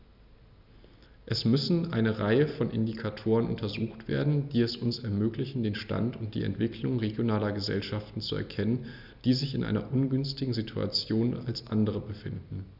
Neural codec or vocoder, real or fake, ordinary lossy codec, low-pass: none; real; none; 5.4 kHz